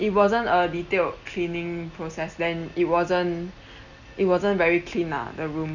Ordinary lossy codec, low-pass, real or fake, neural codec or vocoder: Opus, 64 kbps; 7.2 kHz; real; none